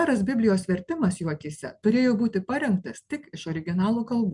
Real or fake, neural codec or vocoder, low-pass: real; none; 10.8 kHz